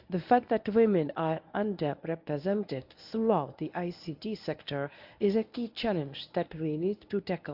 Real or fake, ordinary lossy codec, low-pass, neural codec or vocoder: fake; AAC, 48 kbps; 5.4 kHz; codec, 24 kHz, 0.9 kbps, WavTokenizer, medium speech release version 1